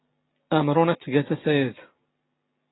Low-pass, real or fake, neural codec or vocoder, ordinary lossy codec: 7.2 kHz; real; none; AAC, 16 kbps